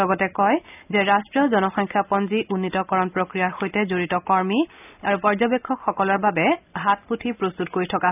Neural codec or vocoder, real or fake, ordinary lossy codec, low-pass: none; real; none; 3.6 kHz